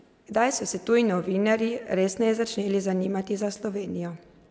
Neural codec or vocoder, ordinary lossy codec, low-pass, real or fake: none; none; none; real